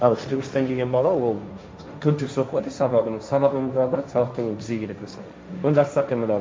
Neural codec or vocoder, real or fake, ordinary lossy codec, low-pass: codec, 16 kHz, 1.1 kbps, Voila-Tokenizer; fake; none; none